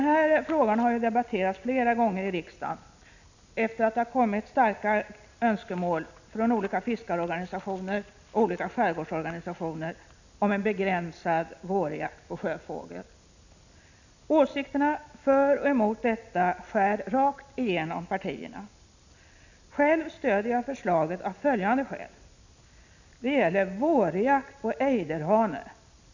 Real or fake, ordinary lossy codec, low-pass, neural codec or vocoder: real; none; 7.2 kHz; none